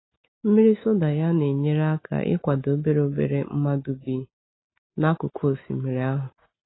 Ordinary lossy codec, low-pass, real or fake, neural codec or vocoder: AAC, 16 kbps; 7.2 kHz; real; none